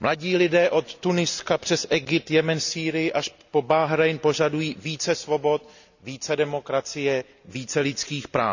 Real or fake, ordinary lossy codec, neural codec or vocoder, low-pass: real; none; none; 7.2 kHz